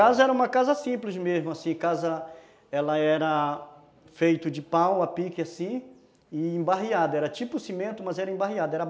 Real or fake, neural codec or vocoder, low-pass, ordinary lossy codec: real; none; none; none